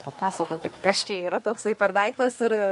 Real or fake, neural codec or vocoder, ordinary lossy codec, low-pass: fake; codec, 24 kHz, 1 kbps, SNAC; MP3, 64 kbps; 10.8 kHz